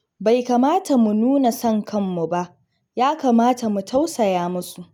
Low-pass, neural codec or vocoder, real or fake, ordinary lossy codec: 19.8 kHz; none; real; none